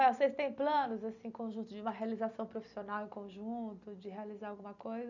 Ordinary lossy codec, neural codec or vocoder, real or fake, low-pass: none; none; real; 7.2 kHz